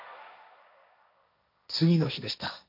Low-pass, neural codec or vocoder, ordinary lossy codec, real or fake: 5.4 kHz; codec, 16 kHz, 1.1 kbps, Voila-Tokenizer; none; fake